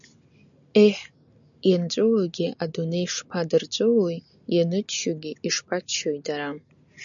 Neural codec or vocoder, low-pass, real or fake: none; 7.2 kHz; real